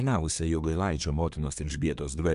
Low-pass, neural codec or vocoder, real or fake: 10.8 kHz; codec, 24 kHz, 1 kbps, SNAC; fake